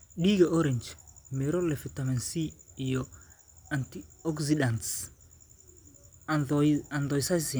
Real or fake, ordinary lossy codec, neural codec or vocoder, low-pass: real; none; none; none